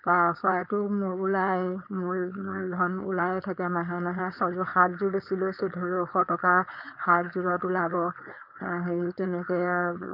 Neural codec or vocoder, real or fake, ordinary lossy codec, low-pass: codec, 16 kHz, 4.8 kbps, FACodec; fake; none; 5.4 kHz